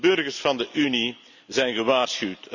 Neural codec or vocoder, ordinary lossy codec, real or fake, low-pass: none; none; real; 7.2 kHz